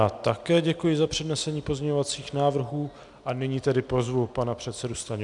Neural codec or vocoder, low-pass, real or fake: none; 10.8 kHz; real